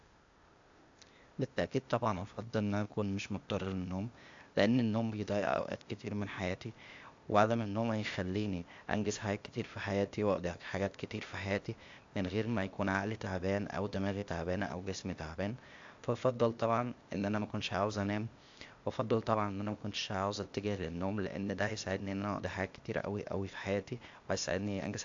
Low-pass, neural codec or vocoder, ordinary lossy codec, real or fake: 7.2 kHz; codec, 16 kHz, 0.8 kbps, ZipCodec; AAC, 64 kbps; fake